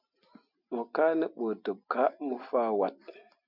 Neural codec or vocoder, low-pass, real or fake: none; 5.4 kHz; real